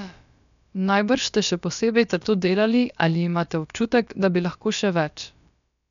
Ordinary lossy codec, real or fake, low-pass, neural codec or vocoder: none; fake; 7.2 kHz; codec, 16 kHz, about 1 kbps, DyCAST, with the encoder's durations